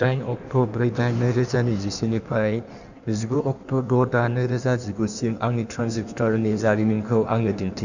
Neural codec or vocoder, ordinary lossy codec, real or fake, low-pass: codec, 16 kHz in and 24 kHz out, 1.1 kbps, FireRedTTS-2 codec; none; fake; 7.2 kHz